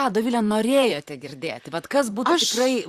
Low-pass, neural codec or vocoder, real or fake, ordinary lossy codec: 14.4 kHz; vocoder, 44.1 kHz, 128 mel bands, Pupu-Vocoder; fake; Opus, 64 kbps